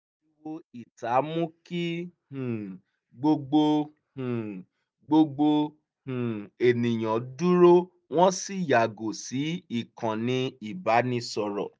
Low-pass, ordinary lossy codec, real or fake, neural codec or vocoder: none; none; real; none